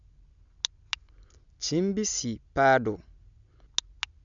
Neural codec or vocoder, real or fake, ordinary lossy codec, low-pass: none; real; none; 7.2 kHz